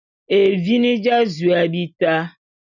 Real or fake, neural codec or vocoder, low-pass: real; none; 7.2 kHz